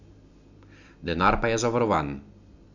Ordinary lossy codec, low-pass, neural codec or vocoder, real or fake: none; 7.2 kHz; none; real